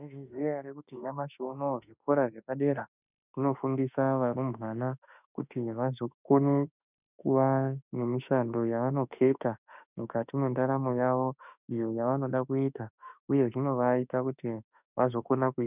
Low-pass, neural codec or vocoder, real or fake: 3.6 kHz; autoencoder, 48 kHz, 32 numbers a frame, DAC-VAE, trained on Japanese speech; fake